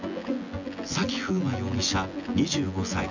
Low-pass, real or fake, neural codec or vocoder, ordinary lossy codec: 7.2 kHz; fake; vocoder, 24 kHz, 100 mel bands, Vocos; none